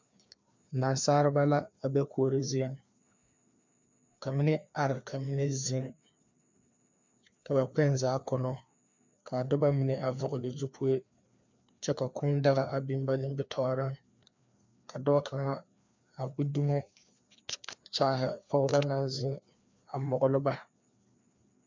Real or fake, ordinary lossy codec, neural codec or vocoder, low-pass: fake; MP3, 64 kbps; codec, 16 kHz, 2 kbps, FreqCodec, larger model; 7.2 kHz